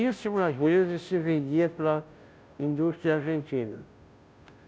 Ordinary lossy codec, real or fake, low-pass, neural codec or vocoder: none; fake; none; codec, 16 kHz, 0.5 kbps, FunCodec, trained on Chinese and English, 25 frames a second